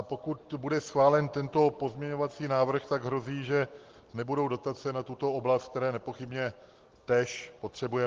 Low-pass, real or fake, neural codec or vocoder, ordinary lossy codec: 7.2 kHz; real; none; Opus, 16 kbps